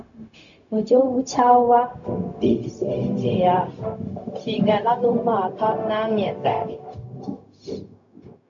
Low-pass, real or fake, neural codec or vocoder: 7.2 kHz; fake; codec, 16 kHz, 0.4 kbps, LongCat-Audio-Codec